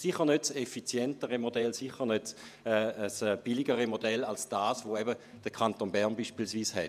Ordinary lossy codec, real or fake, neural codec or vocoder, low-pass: none; fake; vocoder, 48 kHz, 128 mel bands, Vocos; 14.4 kHz